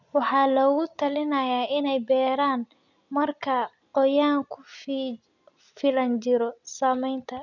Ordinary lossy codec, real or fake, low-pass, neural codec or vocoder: none; real; 7.2 kHz; none